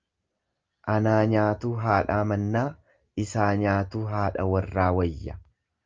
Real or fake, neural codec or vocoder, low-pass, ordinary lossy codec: real; none; 7.2 kHz; Opus, 24 kbps